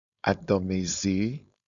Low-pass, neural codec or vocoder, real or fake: 7.2 kHz; codec, 16 kHz, 4.8 kbps, FACodec; fake